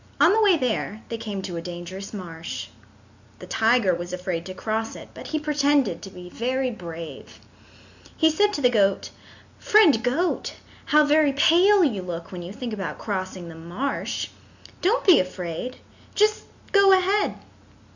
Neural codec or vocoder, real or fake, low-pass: none; real; 7.2 kHz